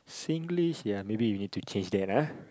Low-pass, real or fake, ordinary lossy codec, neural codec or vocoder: none; real; none; none